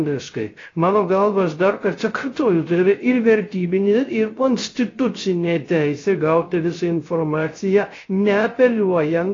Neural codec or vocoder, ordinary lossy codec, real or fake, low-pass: codec, 16 kHz, 0.3 kbps, FocalCodec; AAC, 32 kbps; fake; 7.2 kHz